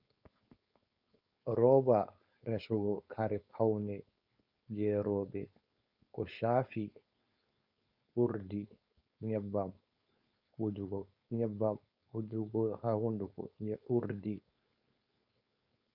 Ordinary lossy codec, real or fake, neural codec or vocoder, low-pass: Opus, 64 kbps; fake; codec, 16 kHz, 4.8 kbps, FACodec; 5.4 kHz